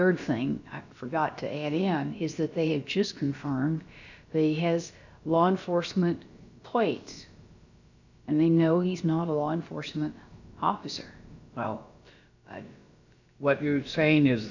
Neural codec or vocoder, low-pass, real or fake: codec, 16 kHz, about 1 kbps, DyCAST, with the encoder's durations; 7.2 kHz; fake